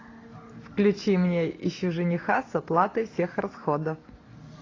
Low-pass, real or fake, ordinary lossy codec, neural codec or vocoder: 7.2 kHz; real; AAC, 32 kbps; none